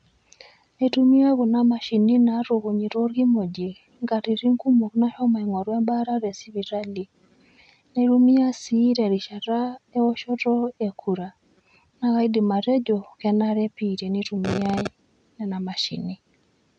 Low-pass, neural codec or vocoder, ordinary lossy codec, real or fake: 9.9 kHz; none; none; real